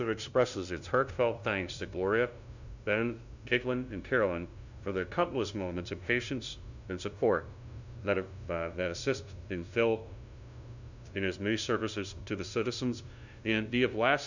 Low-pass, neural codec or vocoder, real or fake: 7.2 kHz; codec, 16 kHz, 0.5 kbps, FunCodec, trained on LibriTTS, 25 frames a second; fake